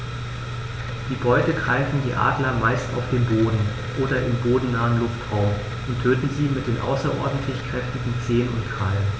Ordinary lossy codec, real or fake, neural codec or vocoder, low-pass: none; real; none; none